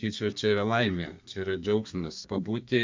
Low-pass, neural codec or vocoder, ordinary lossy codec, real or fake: 7.2 kHz; codec, 32 kHz, 1.9 kbps, SNAC; MP3, 64 kbps; fake